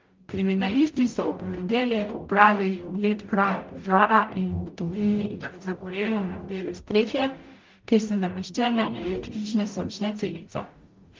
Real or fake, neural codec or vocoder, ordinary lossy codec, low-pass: fake; codec, 44.1 kHz, 0.9 kbps, DAC; Opus, 32 kbps; 7.2 kHz